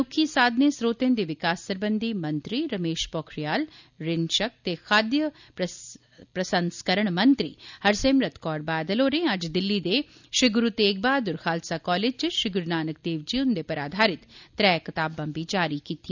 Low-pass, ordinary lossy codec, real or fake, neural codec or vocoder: 7.2 kHz; none; real; none